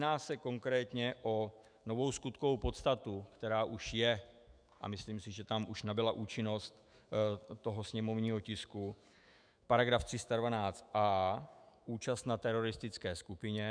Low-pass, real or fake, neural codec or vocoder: 9.9 kHz; fake; autoencoder, 48 kHz, 128 numbers a frame, DAC-VAE, trained on Japanese speech